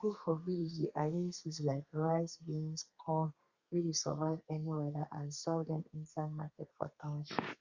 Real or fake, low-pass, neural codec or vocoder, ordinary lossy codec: fake; 7.2 kHz; codec, 32 kHz, 1.9 kbps, SNAC; Opus, 64 kbps